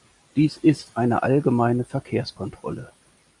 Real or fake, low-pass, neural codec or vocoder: real; 10.8 kHz; none